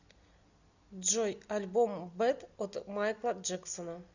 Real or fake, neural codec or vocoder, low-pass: real; none; 7.2 kHz